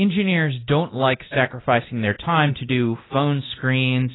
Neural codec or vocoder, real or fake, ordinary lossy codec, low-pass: codec, 24 kHz, 0.9 kbps, DualCodec; fake; AAC, 16 kbps; 7.2 kHz